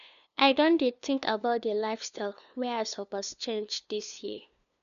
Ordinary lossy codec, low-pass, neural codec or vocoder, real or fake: none; 7.2 kHz; codec, 16 kHz, 2 kbps, FunCodec, trained on LibriTTS, 25 frames a second; fake